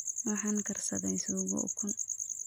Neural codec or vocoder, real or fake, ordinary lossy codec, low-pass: none; real; none; none